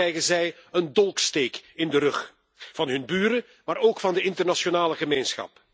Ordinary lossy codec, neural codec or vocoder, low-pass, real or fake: none; none; none; real